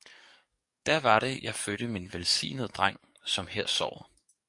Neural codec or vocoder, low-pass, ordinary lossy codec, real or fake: codec, 44.1 kHz, 7.8 kbps, DAC; 10.8 kHz; MP3, 64 kbps; fake